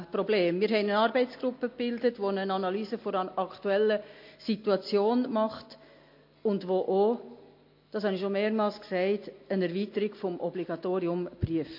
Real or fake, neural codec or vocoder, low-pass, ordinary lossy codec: real; none; 5.4 kHz; MP3, 32 kbps